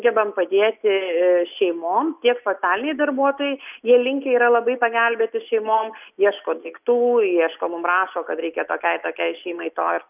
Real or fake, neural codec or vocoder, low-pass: real; none; 3.6 kHz